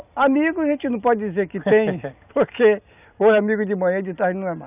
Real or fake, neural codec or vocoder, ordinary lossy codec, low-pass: real; none; none; 3.6 kHz